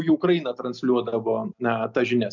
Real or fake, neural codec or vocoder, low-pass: real; none; 7.2 kHz